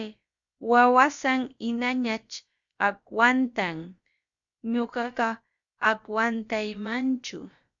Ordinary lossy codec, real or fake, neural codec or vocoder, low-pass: Opus, 64 kbps; fake; codec, 16 kHz, about 1 kbps, DyCAST, with the encoder's durations; 7.2 kHz